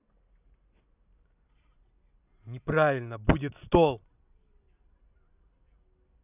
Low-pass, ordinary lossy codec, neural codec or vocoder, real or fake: 3.6 kHz; none; none; real